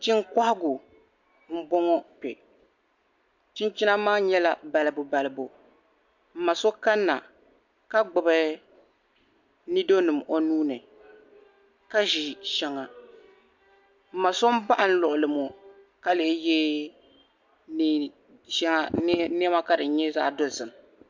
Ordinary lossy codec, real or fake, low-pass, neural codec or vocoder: MP3, 64 kbps; real; 7.2 kHz; none